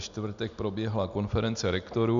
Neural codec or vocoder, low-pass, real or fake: none; 7.2 kHz; real